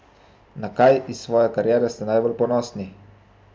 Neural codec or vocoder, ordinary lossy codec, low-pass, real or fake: none; none; none; real